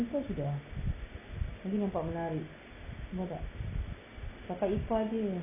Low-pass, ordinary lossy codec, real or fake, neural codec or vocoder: 3.6 kHz; none; real; none